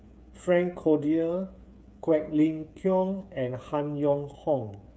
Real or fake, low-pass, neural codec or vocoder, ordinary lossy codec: fake; none; codec, 16 kHz, 8 kbps, FreqCodec, smaller model; none